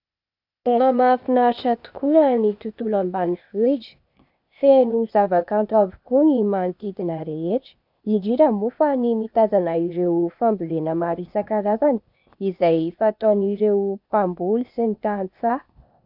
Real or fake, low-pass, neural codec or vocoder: fake; 5.4 kHz; codec, 16 kHz, 0.8 kbps, ZipCodec